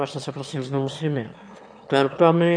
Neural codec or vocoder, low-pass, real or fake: autoencoder, 22.05 kHz, a latent of 192 numbers a frame, VITS, trained on one speaker; 9.9 kHz; fake